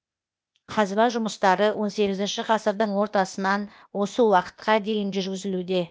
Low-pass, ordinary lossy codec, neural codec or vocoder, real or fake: none; none; codec, 16 kHz, 0.8 kbps, ZipCodec; fake